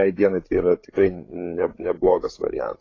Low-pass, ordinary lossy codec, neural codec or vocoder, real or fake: 7.2 kHz; AAC, 32 kbps; codec, 16 kHz, 8 kbps, FreqCodec, larger model; fake